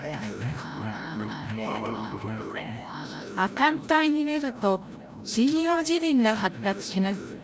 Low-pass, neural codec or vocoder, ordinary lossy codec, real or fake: none; codec, 16 kHz, 0.5 kbps, FreqCodec, larger model; none; fake